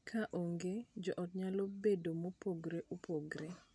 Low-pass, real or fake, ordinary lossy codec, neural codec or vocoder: none; real; none; none